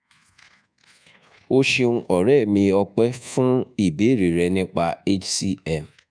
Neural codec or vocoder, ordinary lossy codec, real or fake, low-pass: codec, 24 kHz, 1.2 kbps, DualCodec; none; fake; 9.9 kHz